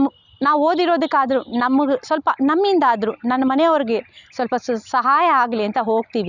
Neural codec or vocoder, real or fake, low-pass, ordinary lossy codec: none; real; 7.2 kHz; none